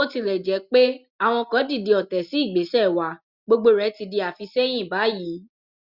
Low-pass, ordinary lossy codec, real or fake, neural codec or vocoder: 5.4 kHz; Opus, 64 kbps; real; none